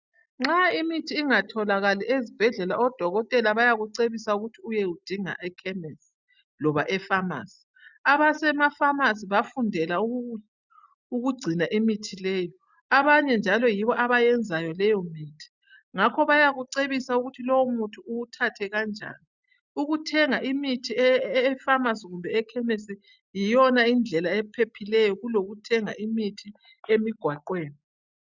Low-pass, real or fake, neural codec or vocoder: 7.2 kHz; real; none